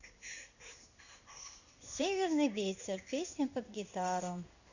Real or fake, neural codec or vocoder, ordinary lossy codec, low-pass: fake; codec, 16 kHz in and 24 kHz out, 1 kbps, XY-Tokenizer; none; 7.2 kHz